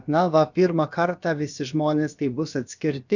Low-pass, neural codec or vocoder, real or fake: 7.2 kHz; codec, 16 kHz, about 1 kbps, DyCAST, with the encoder's durations; fake